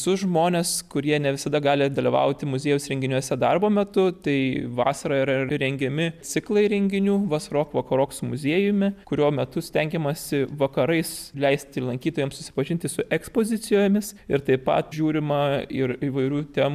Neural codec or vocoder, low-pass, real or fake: none; 14.4 kHz; real